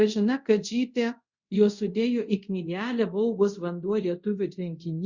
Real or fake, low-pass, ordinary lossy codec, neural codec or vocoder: fake; 7.2 kHz; Opus, 64 kbps; codec, 24 kHz, 0.5 kbps, DualCodec